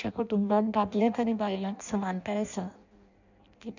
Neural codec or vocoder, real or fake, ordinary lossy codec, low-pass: codec, 16 kHz in and 24 kHz out, 0.6 kbps, FireRedTTS-2 codec; fake; none; 7.2 kHz